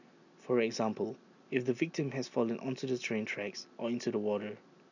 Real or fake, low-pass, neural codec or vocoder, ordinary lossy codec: real; 7.2 kHz; none; none